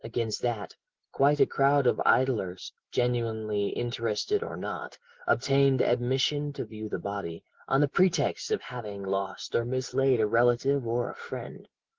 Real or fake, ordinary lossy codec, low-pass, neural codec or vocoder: real; Opus, 16 kbps; 7.2 kHz; none